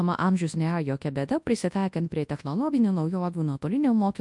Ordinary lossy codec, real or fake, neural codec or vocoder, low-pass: MP3, 64 kbps; fake; codec, 24 kHz, 0.9 kbps, WavTokenizer, large speech release; 10.8 kHz